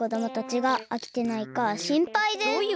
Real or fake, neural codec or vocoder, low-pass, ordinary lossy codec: real; none; none; none